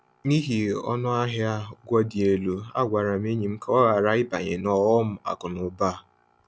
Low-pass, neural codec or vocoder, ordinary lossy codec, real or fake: none; none; none; real